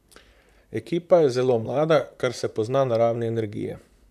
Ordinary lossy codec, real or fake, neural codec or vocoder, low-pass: none; fake; vocoder, 44.1 kHz, 128 mel bands, Pupu-Vocoder; 14.4 kHz